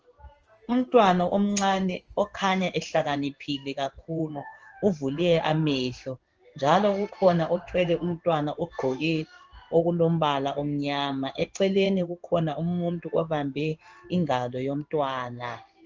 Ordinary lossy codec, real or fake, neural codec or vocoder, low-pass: Opus, 24 kbps; fake; codec, 16 kHz in and 24 kHz out, 1 kbps, XY-Tokenizer; 7.2 kHz